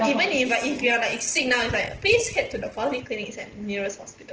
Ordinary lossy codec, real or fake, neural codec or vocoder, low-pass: Opus, 16 kbps; real; none; 7.2 kHz